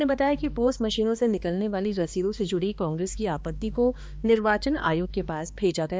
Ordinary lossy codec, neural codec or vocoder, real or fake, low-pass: none; codec, 16 kHz, 2 kbps, X-Codec, HuBERT features, trained on balanced general audio; fake; none